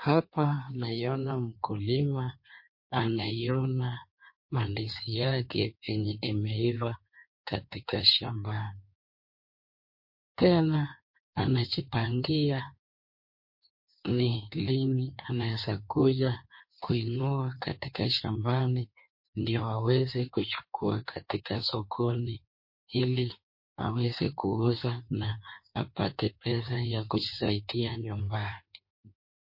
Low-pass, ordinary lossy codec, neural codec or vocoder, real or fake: 5.4 kHz; MP3, 32 kbps; codec, 16 kHz in and 24 kHz out, 1.1 kbps, FireRedTTS-2 codec; fake